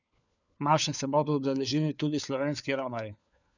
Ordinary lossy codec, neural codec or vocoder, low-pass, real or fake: none; codec, 16 kHz, 4 kbps, FunCodec, trained on LibriTTS, 50 frames a second; 7.2 kHz; fake